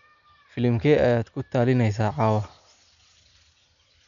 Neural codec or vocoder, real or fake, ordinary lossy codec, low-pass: none; real; none; 7.2 kHz